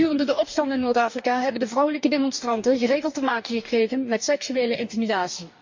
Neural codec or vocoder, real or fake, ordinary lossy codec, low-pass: codec, 44.1 kHz, 2.6 kbps, DAC; fake; MP3, 64 kbps; 7.2 kHz